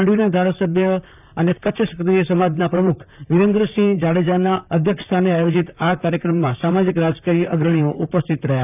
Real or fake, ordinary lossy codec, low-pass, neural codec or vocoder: fake; none; 3.6 kHz; vocoder, 44.1 kHz, 128 mel bands, Pupu-Vocoder